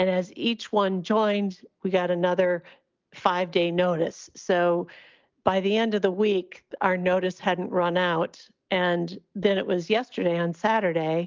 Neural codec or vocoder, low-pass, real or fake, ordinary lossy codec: none; 7.2 kHz; real; Opus, 24 kbps